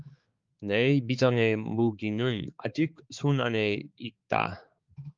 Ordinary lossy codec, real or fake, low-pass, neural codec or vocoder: Opus, 32 kbps; fake; 7.2 kHz; codec, 16 kHz, 4 kbps, X-Codec, HuBERT features, trained on balanced general audio